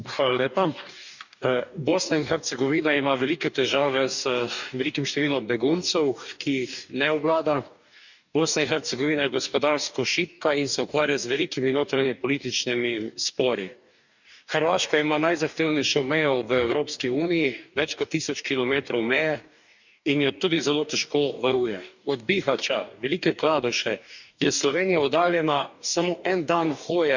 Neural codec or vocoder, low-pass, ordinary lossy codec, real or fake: codec, 44.1 kHz, 2.6 kbps, DAC; 7.2 kHz; none; fake